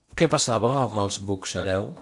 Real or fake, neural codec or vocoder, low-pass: fake; codec, 16 kHz in and 24 kHz out, 0.8 kbps, FocalCodec, streaming, 65536 codes; 10.8 kHz